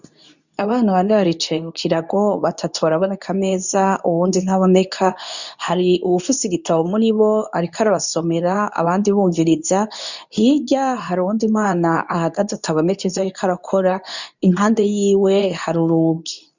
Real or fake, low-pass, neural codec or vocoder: fake; 7.2 kHz; codec, 24 kHz, 0.9 kbps, WavTokenizer, medium speech release version 2